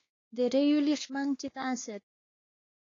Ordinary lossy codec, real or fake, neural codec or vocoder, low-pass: AAC, 32 kbps; fake; codec, 16 kHz, 2 kbps, X-Codec, WavLM features, trained on Multilingual LibriSpeech; 7.2 kHz